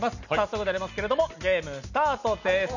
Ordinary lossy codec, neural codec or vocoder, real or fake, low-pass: none; none; real; 7.2 kHz